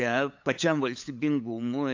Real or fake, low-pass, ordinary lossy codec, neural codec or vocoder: fake; 7.2 kHz; AAC, 48 kbps; codec, 16 kHz, 8 kbps, FunCodec, trained on LibriTTS, 25 frames a second